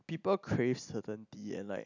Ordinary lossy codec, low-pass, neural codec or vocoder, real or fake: none; 7.2 kHz; none; real